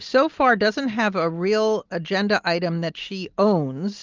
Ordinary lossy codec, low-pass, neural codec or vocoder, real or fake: Opus, 32 kbps; 7.2 kHz; none; real